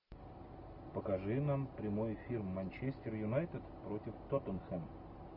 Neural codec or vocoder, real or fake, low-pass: none; real; 5.4 kHz